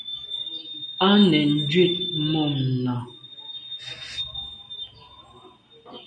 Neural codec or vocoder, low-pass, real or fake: none; 9.9 kHz; real